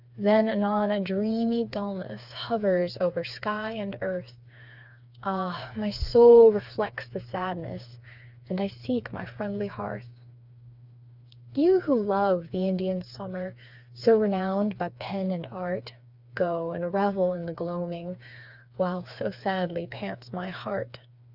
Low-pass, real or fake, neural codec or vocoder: 5.4 kHz; fake; codec, 16 kHz, 4 kbps, FreqCodec, smaller model